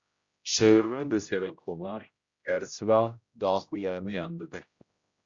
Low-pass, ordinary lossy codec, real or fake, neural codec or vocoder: 7.2 kHz; Opus, 64 kbps; fake; codec, 16 kHz, 0.5 kbps, X-Codec, HuBERT features, trained on general audio